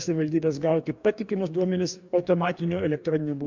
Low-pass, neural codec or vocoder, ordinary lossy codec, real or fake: 7.2 kHz; codec, 44.1 kHz, 2.6 kbps, DAC; MP3, 64 kbps; fake